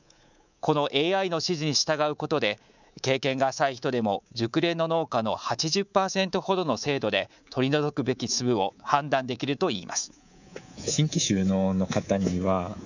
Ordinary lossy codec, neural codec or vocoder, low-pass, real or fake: none; codec, 24 kHz, 3.1 kbps, DualCodec; 7.2 kHz; fake